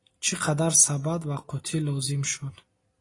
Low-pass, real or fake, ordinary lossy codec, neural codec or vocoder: 10.8 kHz; real; AAC, 32 kbps; none